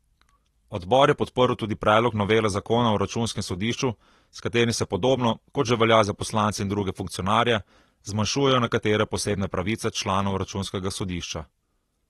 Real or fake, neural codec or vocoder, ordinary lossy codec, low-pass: real; none; AAC, 32 kbps; 19.8 kHz